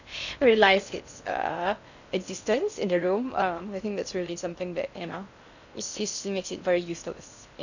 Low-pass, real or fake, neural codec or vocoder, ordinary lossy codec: 7.2 kHz; fake; codec, 16 kHz in and 24 kHz out, 0.6 kbps, FocalCodec, streaming, 2048 codes; none